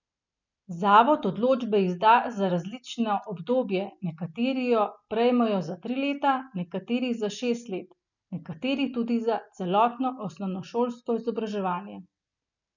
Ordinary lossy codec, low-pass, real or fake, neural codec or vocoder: none; 7.2 kHz; real; none